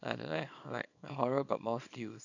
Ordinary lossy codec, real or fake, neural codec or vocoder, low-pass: none; fake; codec, 24 kHz, 0.9 kbps, WavTokenizer, small release; 7.2 kHz